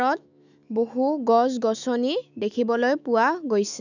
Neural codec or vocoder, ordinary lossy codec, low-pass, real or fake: autoencoder, 48 kHz, 128 numbers a frame, DAC-VAE, trained on Japanese speech; none; 7.2 kHz; fake